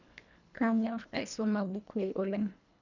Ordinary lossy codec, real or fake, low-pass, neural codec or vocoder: none; fake; 7.2 kHz; codec, 24 kHz, 1.5 kbps, HILCodec